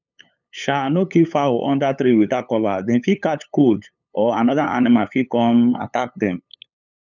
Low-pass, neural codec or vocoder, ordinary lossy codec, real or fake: 7.2 kHz; codec, 16 kHz, 8 kbps, FunCodec, trained on LibriTTS, 25 frames a second; none; fake